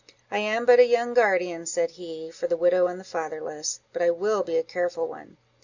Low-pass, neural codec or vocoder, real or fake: 7.2 kHz; none; real